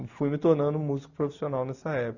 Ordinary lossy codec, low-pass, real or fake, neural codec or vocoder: none; 7.2 kHz; real; none